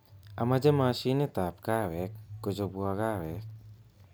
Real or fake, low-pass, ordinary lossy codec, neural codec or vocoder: real; none; none; none